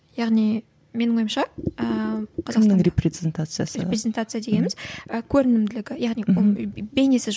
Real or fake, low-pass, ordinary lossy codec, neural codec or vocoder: real; none; none; none